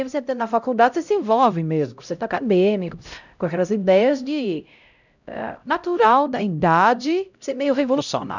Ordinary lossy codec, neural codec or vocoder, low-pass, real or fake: none; codec, 16 kHz, 0.5 kbps, X-Codec, HuBERT features, trained on LibriSpeech; 7.2 kHz; fake